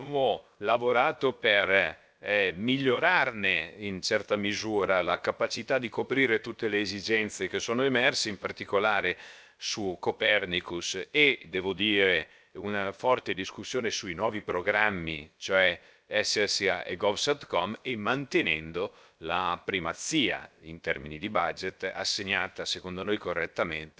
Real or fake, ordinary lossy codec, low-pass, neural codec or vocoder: fake; none; none; codec, 16 kHz, about 1 kbps, DyCAST, with the encoder's durations